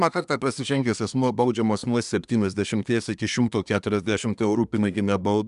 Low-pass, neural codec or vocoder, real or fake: 10.8 kHz; codec, 24 kHz, 1 kbps, SNAC; fake